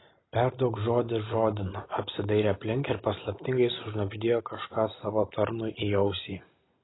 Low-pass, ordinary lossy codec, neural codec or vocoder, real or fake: 7.2 kHz; AAC, 16 kbps; none; real